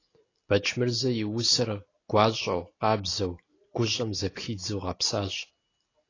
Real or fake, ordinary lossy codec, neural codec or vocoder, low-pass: real; AAC, 32 kbps; none; 7.2 kHz